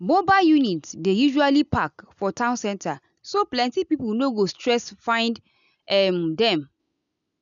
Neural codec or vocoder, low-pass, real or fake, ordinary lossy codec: none; 7.2 kHz; real; none